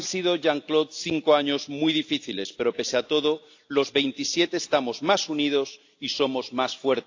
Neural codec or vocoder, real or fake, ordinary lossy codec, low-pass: none; real; AAC, 48 kbps; 7.2 kHz